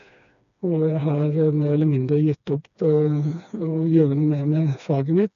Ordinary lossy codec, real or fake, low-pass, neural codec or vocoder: none; fake; 7.2 kHz; codec, 16 kHz, 2 kbps, FreqCodec, smaller model